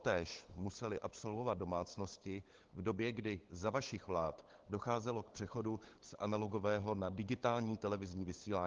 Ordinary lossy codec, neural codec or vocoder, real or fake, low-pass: Opus, 16 kbps; codec, 16 kHz, 8 kbps, FunCodec, trained on LibriTTS, 25 frames a second; fake; 7.2 kHz